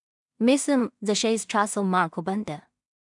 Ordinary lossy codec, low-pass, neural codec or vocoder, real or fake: none; 10.8 kHz; codec, 16 kHz in and 24 kHz out, 0.4 kbps, LongCat-Audio-Codec, two codebook decoder; fake